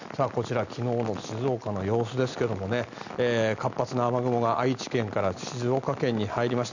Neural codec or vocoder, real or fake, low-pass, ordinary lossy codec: none; real; 7.2 kHz; none